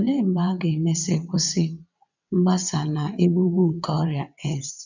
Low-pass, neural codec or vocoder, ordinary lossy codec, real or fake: 7.2 kHz; vocoder, 22.05 kHz, 80 mel bands, WaveNeXt; none; fake